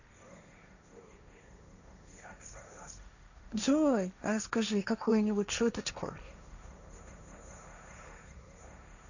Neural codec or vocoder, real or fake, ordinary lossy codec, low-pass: codec, 16 kHz, 1.1 kbps, Voila-Tokenizer; fake; none; 7.2 kHz